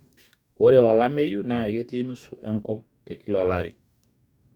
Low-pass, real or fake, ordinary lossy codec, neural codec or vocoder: 19.8 kHz; fake; none; codec, 44.1 kHz, 2.6 kbps, DAC